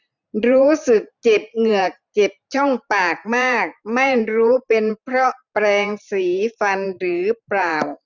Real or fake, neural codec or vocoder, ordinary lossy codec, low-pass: fake; vocoder, 44.1 kHz, 128 mel bands every 512 samples, BigVGAN v2; none; 7.2 kHz